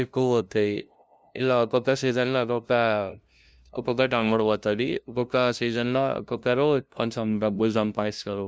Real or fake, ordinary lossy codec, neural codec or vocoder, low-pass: fake; none; codec, 16 kHz, 0.5 kbps, FunCodec, trained on LibriTTS, 25 frames a second; none